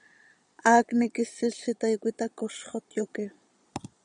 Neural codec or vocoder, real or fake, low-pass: vocoder, 22.05 kHz, 80 mel bands, Vocos; fake; 9.9 kHz